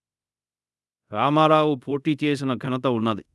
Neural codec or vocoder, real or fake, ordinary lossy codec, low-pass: codec, 24 kHz, 0.5 kbps, DualCodec; fake; none; none